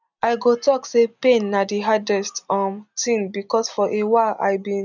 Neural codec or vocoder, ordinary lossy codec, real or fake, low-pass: none; none; real; 7.2 kHz